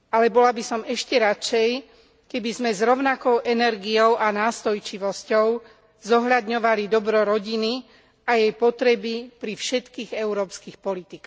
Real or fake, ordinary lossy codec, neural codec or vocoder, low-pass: real; none; none; none